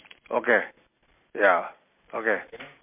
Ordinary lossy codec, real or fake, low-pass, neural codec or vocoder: MP3, 32 kbps; real; 3.6 kHz; none